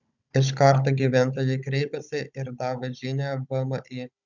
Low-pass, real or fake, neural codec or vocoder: 7.2 kHz; fake; codec, 16 kHz, 16 kbps, FunCodec, trained on Chinese and English, 50 frames a second